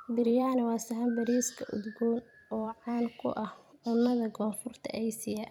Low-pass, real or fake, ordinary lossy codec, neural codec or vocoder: 19.8 kHz; fake; none; vocoder, 44.1 kHz, 128 mel bands every 256 samples, BigVGAN v2